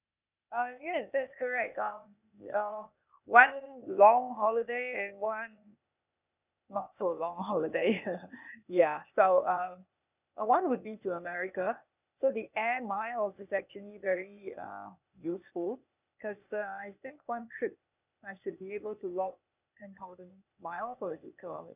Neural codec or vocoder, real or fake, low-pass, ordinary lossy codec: codec, 16 kHz, 0.8 kbps, ZipCodec; fake; 3.6 kHz; none